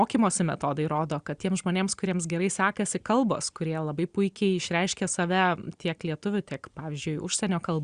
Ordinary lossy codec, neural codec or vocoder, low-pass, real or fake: Opus, 64 kbps; none; 9.9 kHz; real